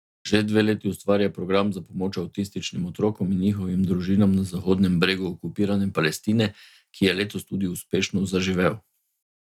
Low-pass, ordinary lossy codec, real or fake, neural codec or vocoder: 19.8 kHz; none; real; none